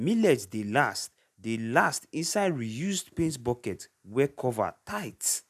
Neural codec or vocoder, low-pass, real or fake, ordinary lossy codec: none; 14.4 kHz; real; none